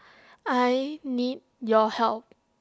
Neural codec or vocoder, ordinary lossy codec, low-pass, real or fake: none; none; none; real